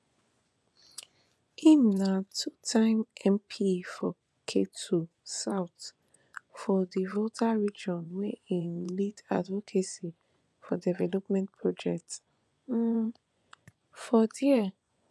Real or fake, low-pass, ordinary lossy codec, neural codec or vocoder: fake; none; none; vocoder, 24 kHz, 100 mel bands, Vocos